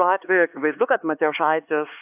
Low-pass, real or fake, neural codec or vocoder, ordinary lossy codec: 3.6 kHz; fake; codec, 16 kHz, 4 kbps, X-Codec, HuBERT features, trained on LibriSpeech; AAC, 32 kbps